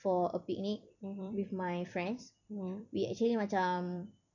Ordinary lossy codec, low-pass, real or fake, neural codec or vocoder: none; 7.2 kHz; fake; vocoder, 44.1 kHz, 128 mel bands every 256 samples, BigVGAN v2